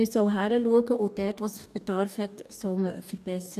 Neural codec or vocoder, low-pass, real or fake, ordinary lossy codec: codec, 44.1 kHz, 2.6 kbps, DAC; 14.4 kHz; fake; none